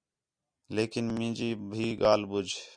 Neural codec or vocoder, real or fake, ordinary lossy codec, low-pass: none; real; MP3, 96 kbps; 9.9 kHz